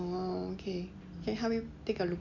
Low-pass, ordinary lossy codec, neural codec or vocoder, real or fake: 7.2 kHz; none; none; real